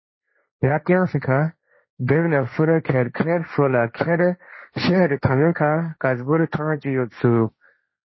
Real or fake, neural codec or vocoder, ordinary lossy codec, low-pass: fake; codec, 16 kHz, 1.1 kbps, Voila-Tokenizer; MP3, 24 kbps; 7.2 kHz